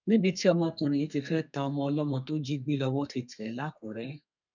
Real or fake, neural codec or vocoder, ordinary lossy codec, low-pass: fake; codec, 32 kHz, 1.9 kbps, SNAC; none; 7.2 kHz